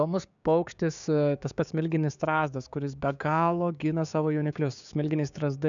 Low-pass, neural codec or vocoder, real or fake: 7.2 kHz; codec, 16 kHz, 6 kbps, DAC; fake